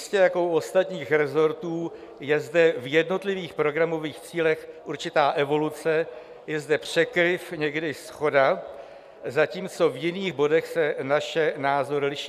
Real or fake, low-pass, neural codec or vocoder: real; 14.4 kHz; none